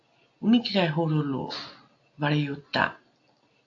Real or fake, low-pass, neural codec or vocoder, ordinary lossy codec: real; 7.2 kHz; none; AAC, 48 kbps